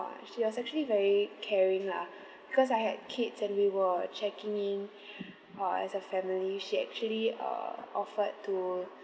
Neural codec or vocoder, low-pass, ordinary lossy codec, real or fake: none; none; none; real